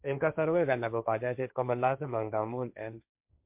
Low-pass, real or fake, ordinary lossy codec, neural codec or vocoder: 3.6 kHz; fake; MP3, 32 kbps; codec, 16 kHz, 1.1 kbps, Voila-Tokenizer